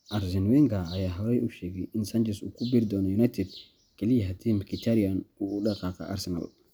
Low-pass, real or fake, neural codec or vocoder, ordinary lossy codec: none; real; none; none